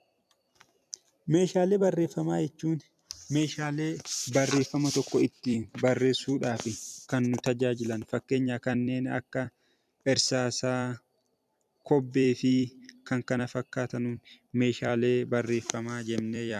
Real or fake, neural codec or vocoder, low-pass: fake; vocoder, 44.1 kHz, 128 mel bands every 256 samples, BigVGAN v2; 14.4 kHz